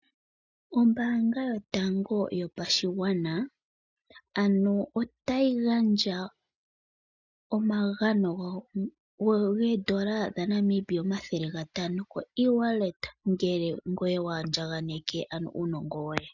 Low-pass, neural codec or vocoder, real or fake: 7.2 kHz; none; real